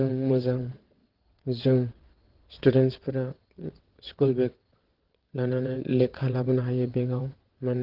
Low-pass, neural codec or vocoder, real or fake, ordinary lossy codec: 5.4 kHz; vocoder, 22.05 kHz, 80 mel bands, WaveNeXt; fake; Opus, 16 kbps